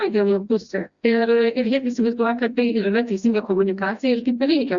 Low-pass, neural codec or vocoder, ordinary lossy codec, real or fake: 7.2 kHz; codec, 16 kHz, 1 kbps, FreqCodec, smaller model; Opus, 64 kbps; fake